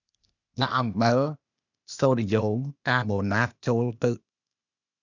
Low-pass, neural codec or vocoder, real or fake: 7.2 kHz; codec, 16 kHz, 0.8 kbps, ZipCodec; fake